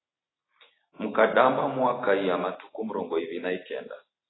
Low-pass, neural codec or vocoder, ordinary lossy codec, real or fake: 7.2 kHz; none; AAC, 16 kbps; real